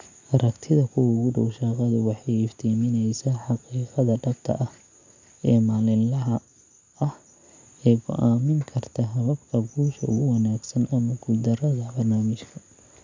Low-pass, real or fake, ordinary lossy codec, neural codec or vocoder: 7.2 kHz; real; MP3, 64 kbps; none